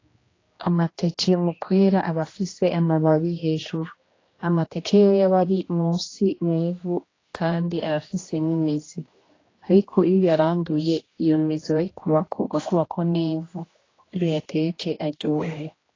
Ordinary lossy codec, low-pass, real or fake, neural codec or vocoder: AAC, 32 kbps; 7.2 kHz; fake; codec, 16 kHz, 1 kbps, X-Codec, HuBERT features, trained on general audio